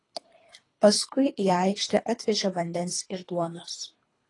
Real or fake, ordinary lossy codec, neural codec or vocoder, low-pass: fake; AAC, 32 kbps; codec, 24 kHz, 3 kbps, HILCodec; 10.8 kHz